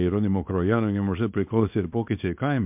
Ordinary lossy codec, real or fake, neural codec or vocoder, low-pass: MP3, 32 kbps; fake; codec, 24 kHz, 0.9 kbps, WavTokenizer, small release; 3.6 kHz